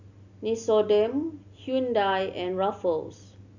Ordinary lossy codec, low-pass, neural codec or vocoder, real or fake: none; 7.2 kHz; none; real